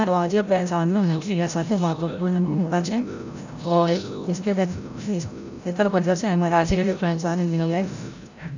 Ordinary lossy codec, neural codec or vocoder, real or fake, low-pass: none; codec, 16 kHz, 0.5 kbps, FreqCodec, larger model; fake; 7.2 kHz